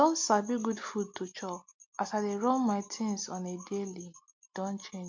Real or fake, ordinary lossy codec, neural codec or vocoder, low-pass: real; MP3, 48 kbps; none; 7.2 kHz